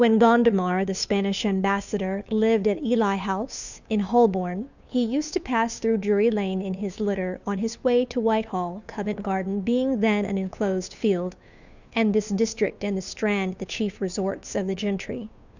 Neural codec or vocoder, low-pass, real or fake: codec, 16 kHz, 2 kbps, FunCodec, trained on Chinese and English, 25 frames a second; 7.2 kHz; fake